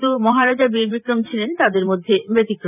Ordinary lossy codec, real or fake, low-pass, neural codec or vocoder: none; fake; 3.6 kHz; vocoder, 44.1 kHz, 128 mel bands every 512 samples, BigVGAN v2